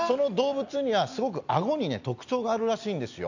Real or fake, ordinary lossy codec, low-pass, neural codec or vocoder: real; none; 7.2 kHz; none